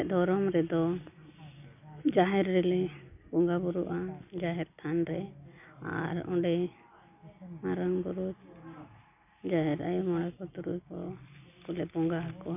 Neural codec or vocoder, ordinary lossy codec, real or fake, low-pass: none; none; real; 3.6 kHz